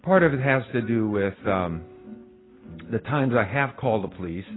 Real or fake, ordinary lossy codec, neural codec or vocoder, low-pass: real; AAC, 16 kbps; none; 7.2 kHz